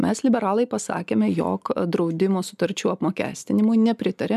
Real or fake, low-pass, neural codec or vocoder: real; 14.4 kHz; none